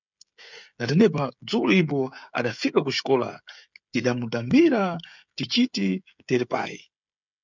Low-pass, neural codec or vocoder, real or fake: 7.2 kHz; codec, 16 kHz, 16 kbps, FreqCodec, smaller model; fake